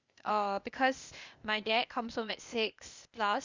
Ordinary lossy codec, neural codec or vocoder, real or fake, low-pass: Opus, 64 kbps; codec, 16 kHz, 0.8 kbps, ZipCodec; fake; 7.2 kHz